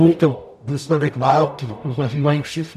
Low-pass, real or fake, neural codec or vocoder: 14.4 kHz; fake; codec, 44.1 kHz, 0.9 kbps, DAC